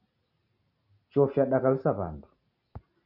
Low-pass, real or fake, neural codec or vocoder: 5.4 kHz; real; none